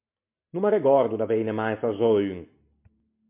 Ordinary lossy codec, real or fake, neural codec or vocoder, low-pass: MP3, 32 kbps; real; none; 3.6 kHz